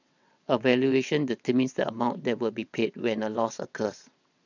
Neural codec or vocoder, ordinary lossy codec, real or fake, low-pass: vocoder, 22.05 kHz, 80 mel bands, WaveNeXt; none; fake; 7.2 kHz